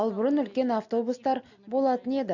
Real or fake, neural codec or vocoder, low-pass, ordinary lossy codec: real; none; 7.2 kHz; none